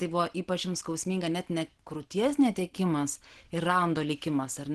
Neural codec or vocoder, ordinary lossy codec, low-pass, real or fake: none; Opus, 16 kbps; 10.8 kHz; real